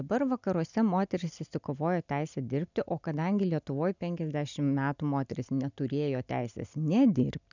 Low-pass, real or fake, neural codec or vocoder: 7.2 kHz; real; none